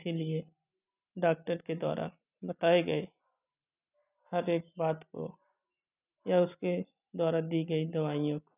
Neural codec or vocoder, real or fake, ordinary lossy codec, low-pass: none; real; none; 3.6 kHz